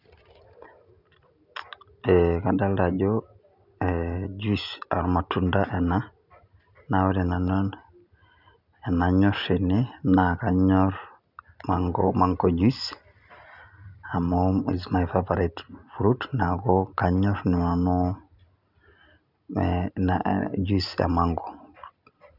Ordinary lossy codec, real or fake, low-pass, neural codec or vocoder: none; real; 5.4 kHz; none